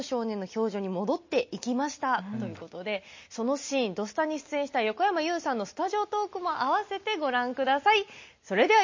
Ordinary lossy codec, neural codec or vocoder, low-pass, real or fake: MP3, 32 kbps; none; 7.2 kHz; real